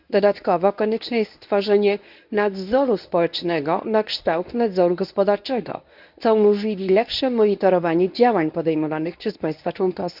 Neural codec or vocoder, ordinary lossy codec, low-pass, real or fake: codec, 24 kHz, 0.9 kbps, WavTokenizer, medium speech release version 1; none; 5.4 kHz; fake